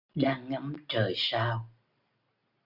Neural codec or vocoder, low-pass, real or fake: none; 5.4 kHz; real